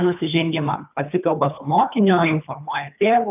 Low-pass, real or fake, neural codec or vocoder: 3.6 kHz; fake; codec, 24 kHz, 3 kbps, HILCodec